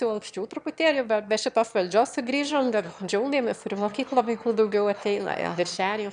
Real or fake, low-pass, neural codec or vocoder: fake; 9.9 kHz; autoencoder, 22.05 kHz, a latent of 192 numbers a frame, VITS, trained on one speaker